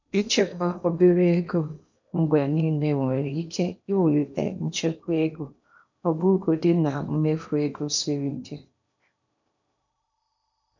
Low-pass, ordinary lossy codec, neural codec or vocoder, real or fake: 7.2 kHz; none; codec, 16 kHz in and 24 kHz out, 0.8 kbps, FocalCodec, streaming, 65536 codes; fake